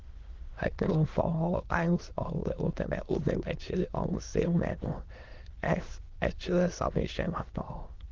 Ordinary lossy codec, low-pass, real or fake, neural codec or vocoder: Opus, 16 kbps; 7.2 kHz; fake; autoencoder, 22.05 kHz, a latent of 192 numbers a frame, VITS, trained on many speakers